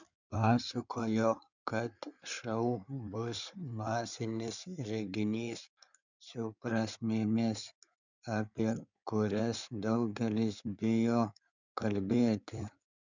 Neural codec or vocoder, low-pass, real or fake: codec, 16 kHz in and 24 kHz out, 2.2 kbps, FireRedTTS-2 codec; 7.2 kHz; fake